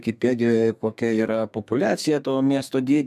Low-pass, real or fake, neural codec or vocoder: 14.4 kHz; fake; codec, 32 kHz, 1.9 kbps, SNAC